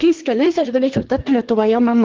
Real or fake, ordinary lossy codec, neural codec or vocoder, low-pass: fake; Opus, 16 kbps; codec, 24 kHz, 1 kbps, SNAC; 7.2 kHz